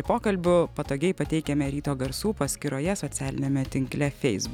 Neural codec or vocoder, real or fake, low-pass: none; real; 19.8 kHz